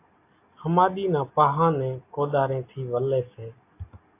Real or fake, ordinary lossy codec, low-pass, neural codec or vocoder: real; AAC, 24 kbps; 3.6 kHz; none